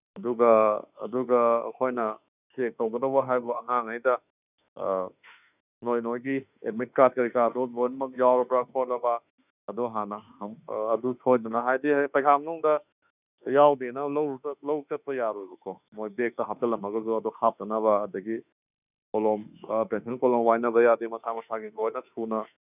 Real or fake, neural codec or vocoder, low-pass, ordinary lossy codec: fake; autoencoder, 48 kHz, 32 numbers a frame, DAC-VAE, trained on Japanese speech; 3.6 kHz; none